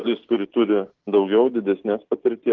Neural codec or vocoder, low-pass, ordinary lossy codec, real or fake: none; 7.2 kHz; Opus, 16 kbps; real